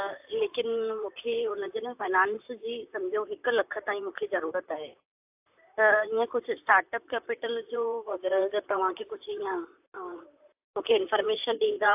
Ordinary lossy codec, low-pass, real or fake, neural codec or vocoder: AAC, 32 kbps; 3.6 kHz; fake; vocoder, 44.1 kHz, 128 mel bands, Pupu-Vocoder